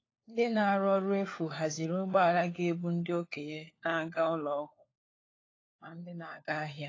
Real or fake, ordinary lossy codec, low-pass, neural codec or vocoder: fake; AAC, 32 kbps; 7.2 kHz; codec, 16 kHz, 4 kbps, FunCodec, trained on LibriTTS, 50 frames a second